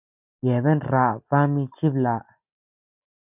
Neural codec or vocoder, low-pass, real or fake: none; 3.6 kHz; real